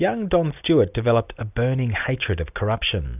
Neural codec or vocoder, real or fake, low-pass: none; real; 3.6 kHz